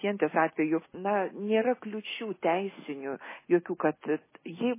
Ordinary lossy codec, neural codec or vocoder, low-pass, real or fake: MP3, 16 kbps; none; 3.6 kHz; real